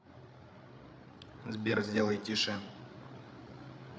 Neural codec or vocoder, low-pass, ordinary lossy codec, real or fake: codec, 16 kHz, 8 kbps, FreqCodec, larger model; none; none; fake